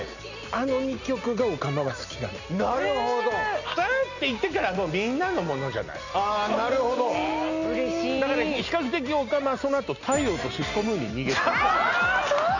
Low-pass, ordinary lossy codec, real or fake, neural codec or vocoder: 7.2 kHz; none; real; none